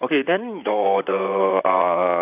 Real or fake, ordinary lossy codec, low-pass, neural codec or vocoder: fake; none; 3.6 kHz; vocoder, 44.1 kHz, 128 mel bands, Pupu-Vocoder